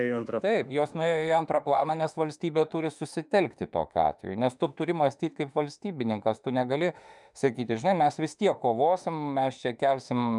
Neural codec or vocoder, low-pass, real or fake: autoencoder, 48 kHz, 32 numbers a frame, DAC-VAE, trained on Japanese speech; 10.8 kHz; fake